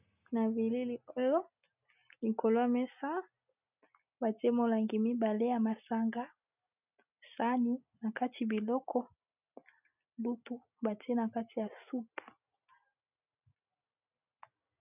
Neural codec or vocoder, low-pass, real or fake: none; 3.6 kHz; real